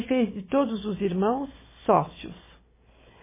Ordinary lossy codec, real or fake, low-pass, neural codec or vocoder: MP3, 16 kbps; fake; 3.6 kHz; codec, 16 kHz, 4 kbps, FunCodec, trained on Chinese and English, 50 frames a second